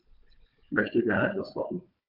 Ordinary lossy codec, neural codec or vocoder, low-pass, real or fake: none; codec, 16 kHz, 4 kbps, FunCodec, trained on Chinese and English, 50 frames a second; 5.4 kHz; fake